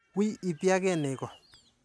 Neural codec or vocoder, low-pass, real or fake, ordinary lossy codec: none; none; real; none